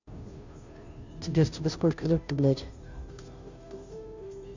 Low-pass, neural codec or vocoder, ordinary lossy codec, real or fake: 7.2 kHz; codec, 16 kHz, 0.5 kbps, FunCodec, trained on Chinese and English, 25 frames a second; none; fake